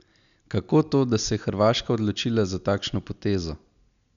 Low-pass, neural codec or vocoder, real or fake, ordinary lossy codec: 7.2 kHz; none; real; none